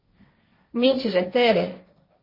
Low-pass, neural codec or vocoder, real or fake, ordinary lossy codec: 5.4 kHz; codec, 16 kHz, 1.1 kbps, Voila-Tokenizer; fake; MP3, 24 kbps